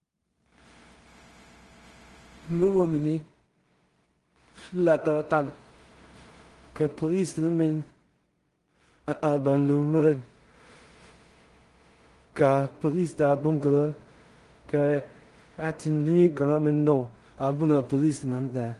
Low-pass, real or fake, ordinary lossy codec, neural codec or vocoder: 10.8 kHz; fake; Opus, 24 kbps; codec, 16 kHz in and 24 kHz out, 0.4 kbps, LongCat-Audio-Codec, two codebook decoder